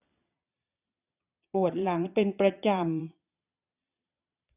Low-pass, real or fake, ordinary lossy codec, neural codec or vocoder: 3.6 kHz; fake; none; vocoder, 22.05 kHz, 80 mel bands, Vocos